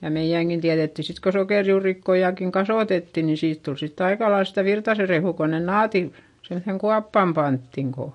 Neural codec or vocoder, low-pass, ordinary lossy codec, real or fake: none; 10.8 kHz; MP3, 48 kbps; real